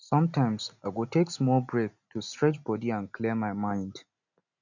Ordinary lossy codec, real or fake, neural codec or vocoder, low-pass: none; real; none; 7.2 kHz